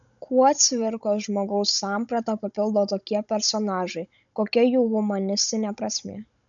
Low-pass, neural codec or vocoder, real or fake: 7.2 kHz; codec, 16 kHz, 16 kbps, FunCodec, trained on Chinese and English, 50 frames a second; fake